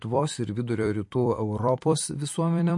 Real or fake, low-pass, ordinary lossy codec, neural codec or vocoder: fake; 10.8 kHz; MP3, 48 kbps; vocoder, 44.1 kHz, 128 mel bands every 256 samples, BigVGAN v2